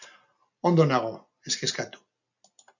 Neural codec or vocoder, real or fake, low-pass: none; real; 7.2 kHz